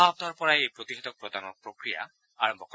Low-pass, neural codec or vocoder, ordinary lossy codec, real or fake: none; none; none; real